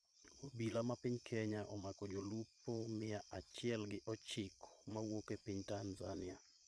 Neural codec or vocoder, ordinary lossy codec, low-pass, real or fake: vocoder, 22.05 kHz, 80 mel bands, Vocos; none; none; fake